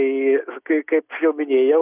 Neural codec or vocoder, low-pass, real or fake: none; 3.6 kHz; real